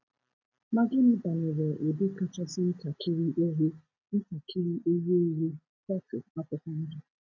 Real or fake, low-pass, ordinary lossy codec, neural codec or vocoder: real; 7.2 kHz; none; none